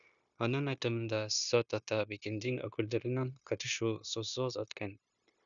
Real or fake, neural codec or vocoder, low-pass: fake; codec, 16 kHz, 0.9 kbps, LongCat-Audio-Codec; 7.2 kHz